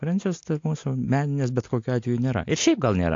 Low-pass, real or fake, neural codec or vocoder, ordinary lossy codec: 7.2 kHz; real; none; AAC, 48 kbps